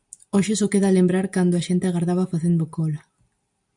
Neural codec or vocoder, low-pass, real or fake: none; 10.8 kHz; real